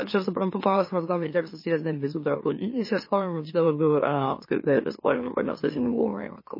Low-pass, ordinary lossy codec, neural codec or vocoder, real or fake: 5.4 kHz; MP3, 24 kbps; autoencoder, 44.1 kHz, a latent of 192 numbers a frame, MeloTTS; fake